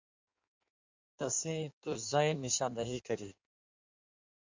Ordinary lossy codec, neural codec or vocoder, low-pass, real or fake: MP3, 64 kbps; codec, 16 kHz in and 24 kHz out, 1.1 kbps, FireRedTTS-2 codec; 7.2 kHz; fake